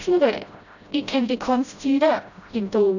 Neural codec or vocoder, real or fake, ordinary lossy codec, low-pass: codec, 16 kHz, 0.5 kbps, FreqCodec, smaller model; fake; none; 7.2 kHz